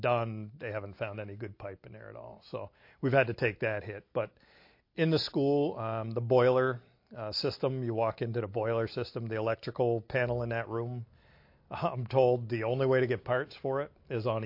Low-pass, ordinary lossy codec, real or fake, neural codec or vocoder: 5.4 kHz; MP3, 32 kbps; real; none